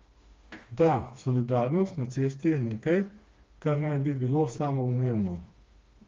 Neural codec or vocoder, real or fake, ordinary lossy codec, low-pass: codec, 16 kHz, 2 kbps, FreqCodec, smaller model; fake; Opus, 32 kbps; 7.2 kHz